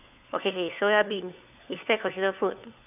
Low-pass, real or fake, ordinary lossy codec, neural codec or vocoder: 3.6 kHz; fake; none; codec, 16 kHz, 4 kbps, FunCodec, trained on LibriTTS, 50 frames a second